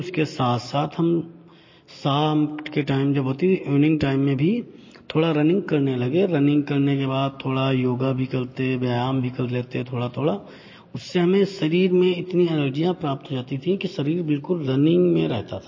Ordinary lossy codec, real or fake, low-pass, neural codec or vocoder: MP3, 32 kbps; real; 7.2 kHz; none